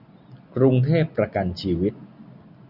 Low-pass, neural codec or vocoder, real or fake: 5.4 kHz; none; real